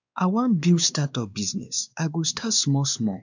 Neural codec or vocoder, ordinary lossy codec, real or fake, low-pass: codec, 16 kHz, 2 kbps, X-Codec, WavLM features, trained on Multilingual LibriSpeech; none; fake; 7.2 kHz